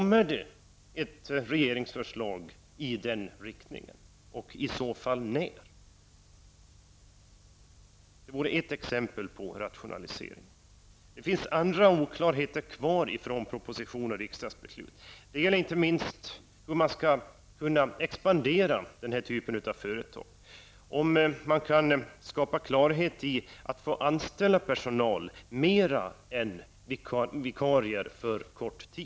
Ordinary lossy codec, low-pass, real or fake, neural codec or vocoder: none; none; real; none